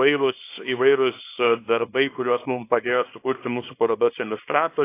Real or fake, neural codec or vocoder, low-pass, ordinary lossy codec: fake; codec, 24 kHz, 0.9 kbps, WavTokenizer, small release; 3.6 kHz; AAC, 24 kbps